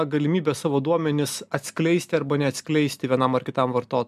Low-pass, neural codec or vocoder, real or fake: 14.4 kHz; none; real